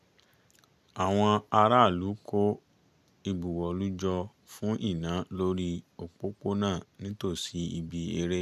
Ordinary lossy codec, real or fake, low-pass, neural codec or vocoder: none; real; 14.4 kHz; none